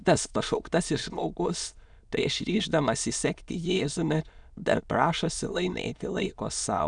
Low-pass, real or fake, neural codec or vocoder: 9.9 kHz; fake; autoencoder, 22.05 kHz, a latent of 192 numbers a frame, VITS, trained on many speakers